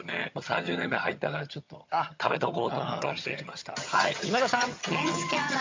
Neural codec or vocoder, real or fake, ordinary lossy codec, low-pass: vocoder, 22.05 kHz, 80 mel bands, HiFi-GAN; fake; MP3, 48 kbps; 7.2 kHz